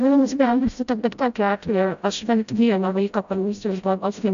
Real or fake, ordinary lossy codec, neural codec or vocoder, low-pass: fake; AAC, 96 kbps; codec, 16 kHz, 0.5 kbps, FreqCodec, smaller model; 7.2 kHz